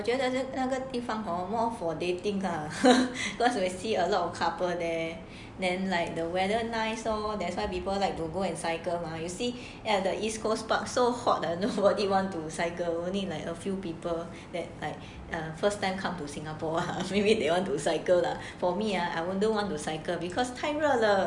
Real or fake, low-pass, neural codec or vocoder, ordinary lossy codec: real; 10.8 kHz; none; none